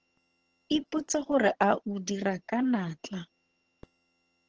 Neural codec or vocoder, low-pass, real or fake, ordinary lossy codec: vocoder, 22.05 kHz, 80 mel bands, HiFi-GAN; 7.2 kHz; fake; Opus, 16 kbps